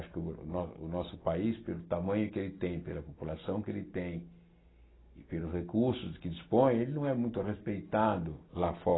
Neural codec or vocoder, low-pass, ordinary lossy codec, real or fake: none; 7.2 kHz; AAC, 16 kbps; real